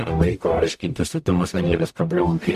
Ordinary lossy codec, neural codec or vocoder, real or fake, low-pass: MP3, 64 kbps; codec, 44.1 kHz, 0.9 kbps, DAC; fake; 14.4 kHz